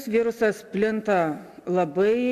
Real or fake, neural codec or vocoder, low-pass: real; none; 14.4 kHz